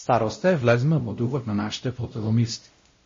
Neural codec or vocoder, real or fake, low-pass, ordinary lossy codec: codec, 16 kHz, 0.5 kbps, X-Codec, WavLM features, trained on Multilingual LibriSpeech; fake; 7.2 kHz; MP3, 32 kbps